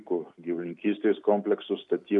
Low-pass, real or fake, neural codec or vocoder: 10.8 kHz; real; none